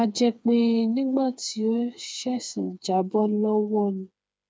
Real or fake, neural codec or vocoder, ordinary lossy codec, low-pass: fake; codec, 16 kHz, 4 kbps, FreqCodec, smaller model; none; none